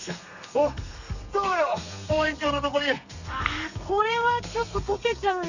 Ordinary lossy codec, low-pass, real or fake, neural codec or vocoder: none; 7.2 kHz; fake; codec, 32 kHz, 1.9 kbps, SNAC